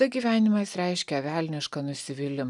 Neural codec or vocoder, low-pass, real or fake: none; 10.8 kHz; real